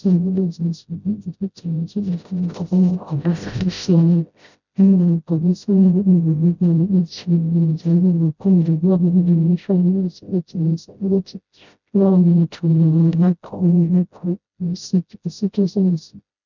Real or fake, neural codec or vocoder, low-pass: fake; codec, 16 kHz, 0.5 kbps, FreqCodec, smaller model; 7.2 kHz